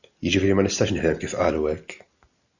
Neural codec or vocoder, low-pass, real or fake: none; 7.2 kHz; real